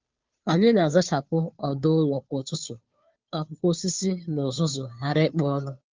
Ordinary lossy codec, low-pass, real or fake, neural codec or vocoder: Opus, 24 kbps; 7.2 kHz; fake; codec, 16 kHz, 2 kbps, FunCodec, trained on Chinese and English, 25 frames a second